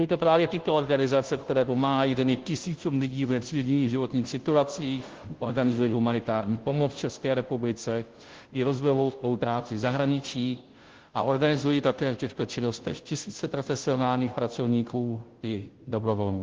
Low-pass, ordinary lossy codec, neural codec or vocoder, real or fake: 7.2 kHz; Opus, 16 kbps; codec, 16 kHz, 0.5 kbps, FunCodec, trained on Chinese and English, 25 frames a second; fake